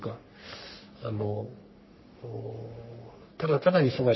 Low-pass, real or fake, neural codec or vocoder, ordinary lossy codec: 7.2 kHz; fake; codec, 44.1 kHz, 3.4 kbps, Pupu-Codec; MP3, 24 kbps